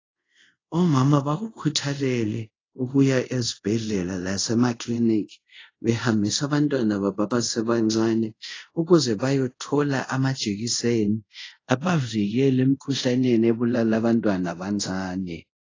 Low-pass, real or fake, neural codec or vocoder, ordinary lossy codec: 7.2 kHz; fake; codec, 24 kHz, 0.5 kbps, DualCodec; AAC, 32 kbps